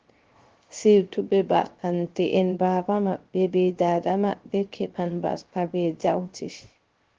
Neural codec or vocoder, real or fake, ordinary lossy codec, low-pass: codec, 16 kHz, 0.3 kbps, FocalCodec; fake; Opus, 16 kbps; 7.2 kHz